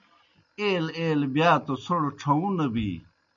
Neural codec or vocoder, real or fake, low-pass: none; real; 7.2 kHz